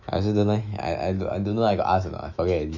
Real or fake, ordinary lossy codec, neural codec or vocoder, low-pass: fake; none; autoencoder, 48 kHz, 128 numbers a frame, DAC-VAE, trained on Japanese speech; 7.2 kHz